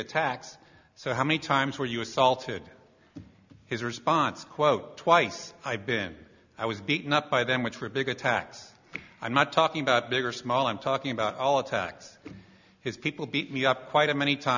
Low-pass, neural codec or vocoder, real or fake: 7.2 kHz; none; real